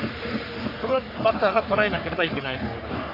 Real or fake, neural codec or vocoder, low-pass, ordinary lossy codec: fake; codec, 44.1 kHz, 3.4 kbps, Pupu-Codec; 5.4 kHz; none